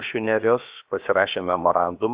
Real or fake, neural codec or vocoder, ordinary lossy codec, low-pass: fake; codec, 16 kHz, about 1 kbps, DyCAST, with the encoder's durations; Opus, 64 kbps; 3.6 kHz